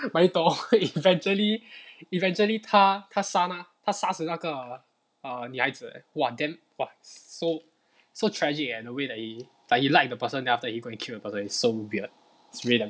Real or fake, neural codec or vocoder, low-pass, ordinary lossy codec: real; none; none; none